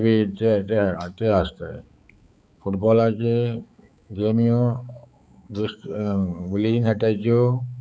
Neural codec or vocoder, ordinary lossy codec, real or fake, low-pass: codec, 16 kHz, 4 kbps, X-Codec, HuBERT features, trained on balanced general audio; none; fake; none